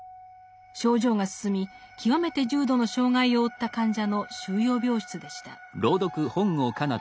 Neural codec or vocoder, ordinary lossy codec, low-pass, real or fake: none; none; none; real